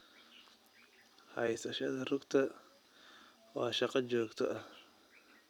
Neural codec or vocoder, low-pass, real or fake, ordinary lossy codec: vocoder, 44.1 kHz, 128 mel bands every 256 samples, BigVGAN v2; 19.8 kHz; fake; none